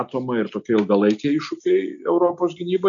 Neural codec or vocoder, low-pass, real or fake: none; 7.2 kHz; real